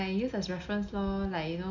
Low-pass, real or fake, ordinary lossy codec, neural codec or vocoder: 7.2 kHz; real; none; none